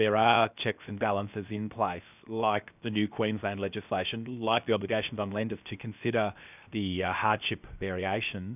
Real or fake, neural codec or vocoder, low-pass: fake; codec, 16 kHz, 0.7 kbps, FocalCodec; 3.6 kHz